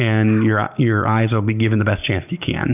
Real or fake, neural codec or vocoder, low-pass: real; none; 3.6 kHz